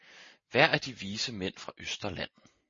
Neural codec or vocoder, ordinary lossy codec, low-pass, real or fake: none; MP3, 32 kbps; 7.2 kHz; real